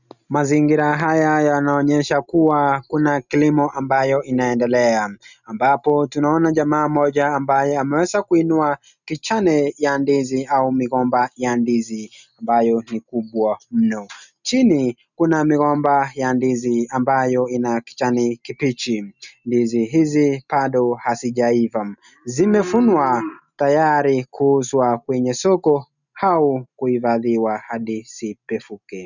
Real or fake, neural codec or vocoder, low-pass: real; none; 7.2 kHz